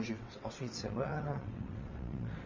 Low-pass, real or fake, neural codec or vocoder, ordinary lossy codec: 7.2 kHz; fake; vocoder, 22.05 kHz, 80 mel bands, WaveNeXt; MP3, 32 kbps